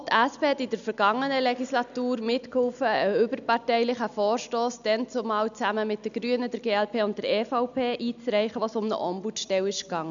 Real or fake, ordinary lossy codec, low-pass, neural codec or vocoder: real; none; 7.2 kHz; none